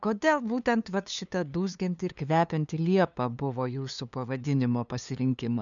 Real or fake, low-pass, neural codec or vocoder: fake; 7.2 kHz; codec, 16 kHz, 2 kbps, FunCodec, trained on LibriTTS, 25 frames a second